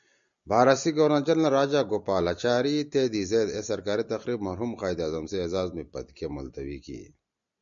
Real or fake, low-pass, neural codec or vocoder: real; 7.2 kHz; none